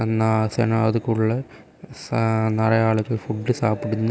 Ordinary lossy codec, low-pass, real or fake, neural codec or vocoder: none; none; real; none